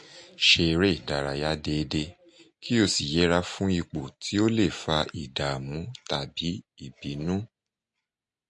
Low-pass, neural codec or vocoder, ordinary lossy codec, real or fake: 10.8 kHz; none; MP3, 48 kbps; real